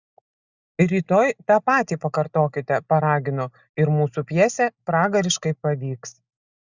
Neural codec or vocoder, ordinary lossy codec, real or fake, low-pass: none; Opus, 64 kbps; real; 7.2 kHz